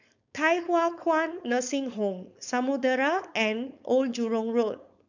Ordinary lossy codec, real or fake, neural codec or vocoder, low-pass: none; fake; codec, 16 kHz, 4.8 kbps, FACodec; 7.2 kHz